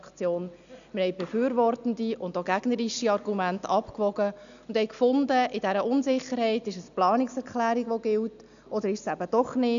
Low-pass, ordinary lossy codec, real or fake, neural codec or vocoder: 7.2 kHz; none; real; none